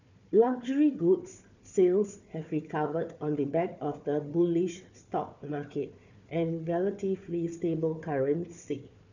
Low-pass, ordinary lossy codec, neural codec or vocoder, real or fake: 7.2 kHz; none; codec, 16 kHz, 4 kbps, FunCodec, trained on Chinese and English, 50 frames a second; fake